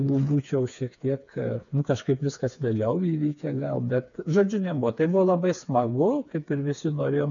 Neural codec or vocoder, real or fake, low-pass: codec, 16 kHz, 4 kbps, FreqCodec, smaller model; fake; 7.2 kHz